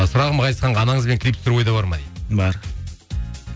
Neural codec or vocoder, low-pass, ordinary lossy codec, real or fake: none; none; none; real